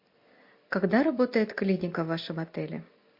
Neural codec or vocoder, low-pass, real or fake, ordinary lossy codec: none; 5.4 kHz; real; MP3, 32 kbps